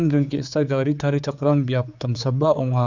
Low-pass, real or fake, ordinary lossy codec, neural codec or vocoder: 7.2 kHz; fake; none; codec, 16 kHz, 4 kbps, X-Codec, HuBERT features, trained on general audio